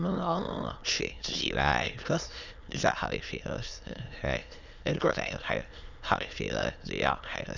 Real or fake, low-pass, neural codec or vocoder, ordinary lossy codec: fake; 7.2 kHz; autoencoder, 22.05 kHz, a latent of 192 numbers a frame, VITS, trained on many speakers; none